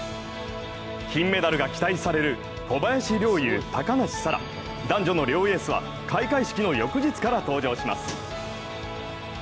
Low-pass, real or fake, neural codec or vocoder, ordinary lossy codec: none; real; none; none